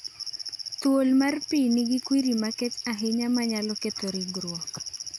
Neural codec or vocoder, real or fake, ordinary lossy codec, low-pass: none; real; none; 19.8 kHz